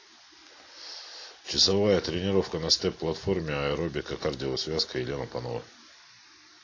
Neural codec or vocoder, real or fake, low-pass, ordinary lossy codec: none; real; 7.2 kHz; AAC, 32 kbps